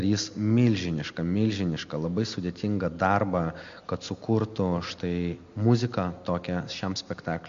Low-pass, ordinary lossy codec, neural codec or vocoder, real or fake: 7.2 kHz; MP3, 48 kbps; none; real